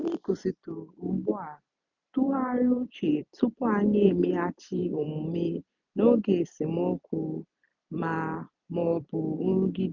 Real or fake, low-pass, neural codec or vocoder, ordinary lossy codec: fake; 7.2 kHz; vocoder, 44.1 kHz, 128 mel bands every 256 samples, BigVGAN v2; none